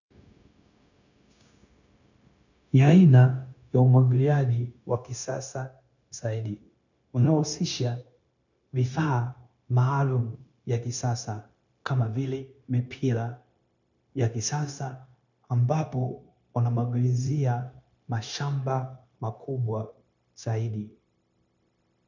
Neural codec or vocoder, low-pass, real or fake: codec, 16 kHz, 0.9 kbps, LongCat-Audio-Codec; 7.2 kHz; fake